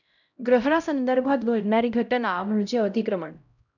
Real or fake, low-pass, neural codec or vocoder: fake; 7.2 kHz; codec, 16 kHz, 0.5 kbps, X-Codec, HuBERT features, trained on LibriSpeech